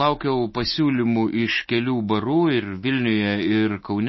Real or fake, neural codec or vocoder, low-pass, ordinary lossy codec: real; none; 7.2 kHz; MP3, 24 kbps